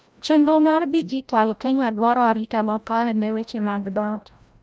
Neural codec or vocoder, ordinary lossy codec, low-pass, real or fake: codec, 16 kHz, 0.5 kbps, FreqCodec, larger model; none; none; fake